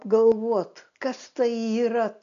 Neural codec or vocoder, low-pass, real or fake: none; 7.2 kHz; real